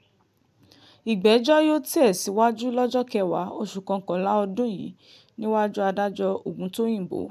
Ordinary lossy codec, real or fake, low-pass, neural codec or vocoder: none; real; 14.4 kHz; none